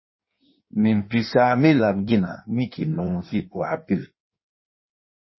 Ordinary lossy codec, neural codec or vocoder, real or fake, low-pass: MP3, 24 kbps; codec, 16 kHz in and 24 kHz out, 1.1 kbps, FireRedTTS-2 codec; fake; 7.2 kHz